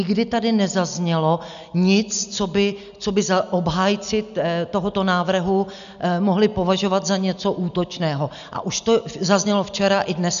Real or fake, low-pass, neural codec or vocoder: real; 7.2 kHz; none